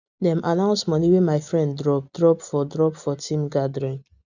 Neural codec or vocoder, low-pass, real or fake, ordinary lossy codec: none; 7.2 kHz; real; none